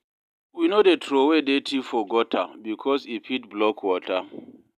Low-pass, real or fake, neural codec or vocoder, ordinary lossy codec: 14.4 kHz; real; none; none